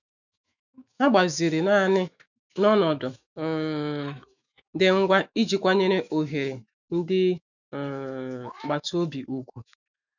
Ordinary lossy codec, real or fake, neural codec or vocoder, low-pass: none; fake; autoencoder, 48 kHz, 128 numbers a frame, DAC-VAE, trained on Japanese speech; 7.2 kHz